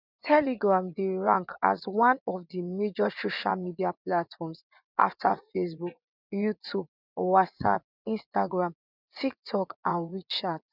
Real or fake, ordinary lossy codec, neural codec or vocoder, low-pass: fake; none; vocoder, 24 kHz, 100 mel bands, Vocos; 5.4 kHz